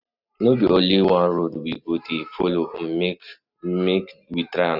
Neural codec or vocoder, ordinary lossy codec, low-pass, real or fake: none; none; 5.4 kHz; real